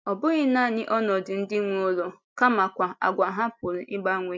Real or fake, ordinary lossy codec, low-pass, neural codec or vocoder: real; none; none; none